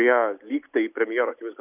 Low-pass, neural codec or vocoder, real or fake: 3.6 kHz; none; real